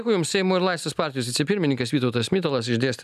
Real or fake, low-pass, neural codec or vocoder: real; 14.4 kHz; none